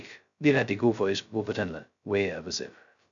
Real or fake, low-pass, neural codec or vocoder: fake; 7.2 kHz; codec, 16 kHz, 0.2 kbps, FocalCodec